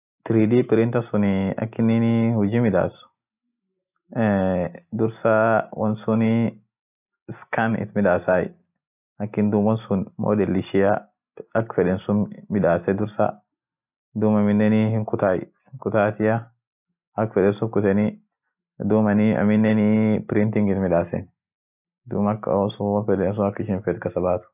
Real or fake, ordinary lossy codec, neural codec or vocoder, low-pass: real; none; none; 3.6 kHz